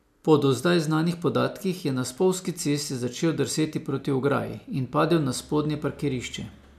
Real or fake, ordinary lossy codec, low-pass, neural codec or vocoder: real; MP3, 96 kbps; 14.4 kHz; none